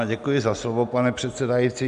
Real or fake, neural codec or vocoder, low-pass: real; none; 10.8 kHz